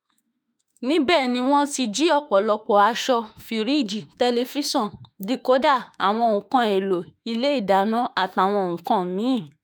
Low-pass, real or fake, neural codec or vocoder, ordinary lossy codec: none; fake; autoencoder, 48 kHz, 32 numbers a frame, DAC-VAE, trained on Japanese speech; none